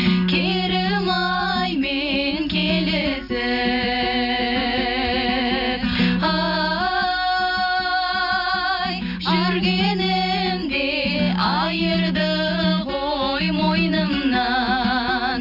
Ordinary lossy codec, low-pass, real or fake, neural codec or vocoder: none; 5.4 kHz; real; none